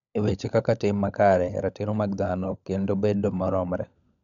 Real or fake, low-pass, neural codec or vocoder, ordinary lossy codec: fake; 7.2 kHz; codec, 16 kHz, 16 kbps, FunCodec, trained on LibriTTS, 50 frames a second; none